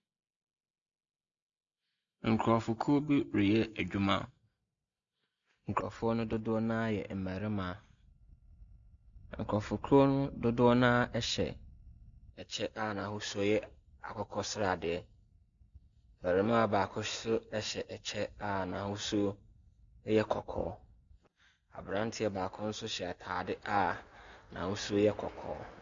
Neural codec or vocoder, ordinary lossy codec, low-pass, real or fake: none; MP3, 64 kbps; 7.2 kHz; real